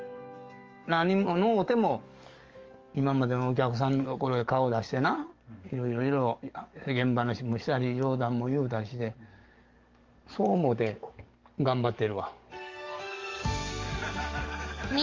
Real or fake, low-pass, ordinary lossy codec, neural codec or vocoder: fake; 7.2 kHz; Opus, 32 kbps; codec, 44.1 kHz, 7.8 kbps, DAC